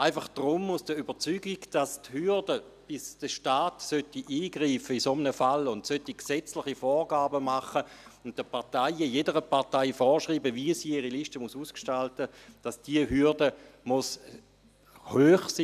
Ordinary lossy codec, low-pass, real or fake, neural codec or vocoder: none; 14.4 kHz; real; none